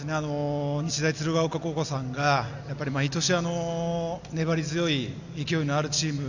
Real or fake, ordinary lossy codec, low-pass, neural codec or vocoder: real; none; 7.2 kHz; none